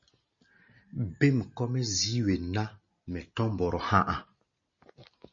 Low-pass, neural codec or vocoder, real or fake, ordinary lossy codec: 7.2 kHz; none; real; MP3, 32 kbps